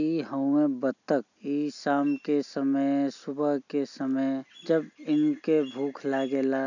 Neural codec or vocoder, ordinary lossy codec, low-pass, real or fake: none; none; 7.2 kHz; real